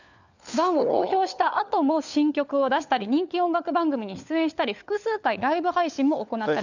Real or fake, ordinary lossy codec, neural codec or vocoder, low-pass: fake; none; codec, 16 kHz, 4 kbps, FunCodec, trained on LibriTTS, 50 frames a second; 7.2 kHz